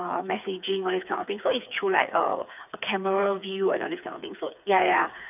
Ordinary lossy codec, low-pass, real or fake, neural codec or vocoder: none; 3.6 kHz; fake; codec, 16 kHz, 4 kbps, FreqCodec, smaller model